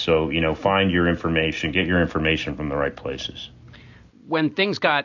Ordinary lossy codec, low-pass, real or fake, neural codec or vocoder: AAC, 48 kbps; 7.2 kHz; real; none